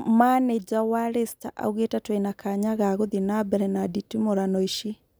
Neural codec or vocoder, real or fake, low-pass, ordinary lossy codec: none; real; none; none